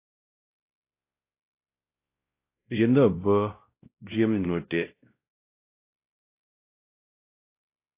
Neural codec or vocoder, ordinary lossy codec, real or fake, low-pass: codec, 16 kHz, 0.5 kbps, X-Codec, WavLM features, trained on Multilingual LibriSpeech; AAC, 24 kbps; fake; 3.6 kHz